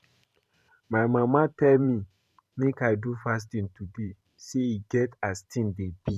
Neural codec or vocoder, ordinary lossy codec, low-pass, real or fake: vocoder, 44.1 kHz, 128 mel bands every 512 samples, BigVGAN v2; none; 14.4 kHz; fake